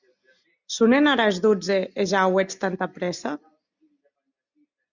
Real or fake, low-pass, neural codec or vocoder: real; 7.2 kHz; none